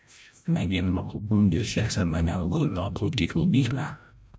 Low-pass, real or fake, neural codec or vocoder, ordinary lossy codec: none; fake; codec, 16 kHz, 0.5 kbps, FreqCodec, larger model; none